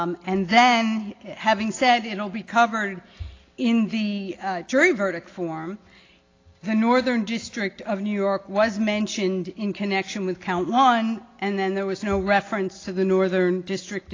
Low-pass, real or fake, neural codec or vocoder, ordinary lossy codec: 7.2 kHz; real; none; AAC, 32 kbps